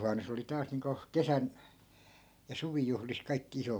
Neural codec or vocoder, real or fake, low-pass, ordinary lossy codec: none; real; none; none